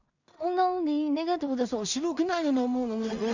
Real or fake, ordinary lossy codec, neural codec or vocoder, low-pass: fake; none; codec, 16 kHz in and 24 kHz out, 0.4 kbps, LongCat-Audio-Codec, two codebook decoder; 7.2 kHz